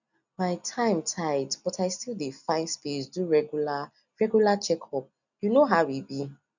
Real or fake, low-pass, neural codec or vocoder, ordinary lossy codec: real; 7.2 kHz; none; none